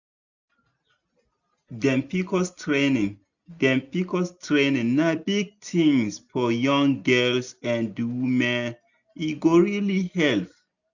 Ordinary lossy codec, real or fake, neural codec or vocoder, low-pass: none; real; none; 7.2 kHz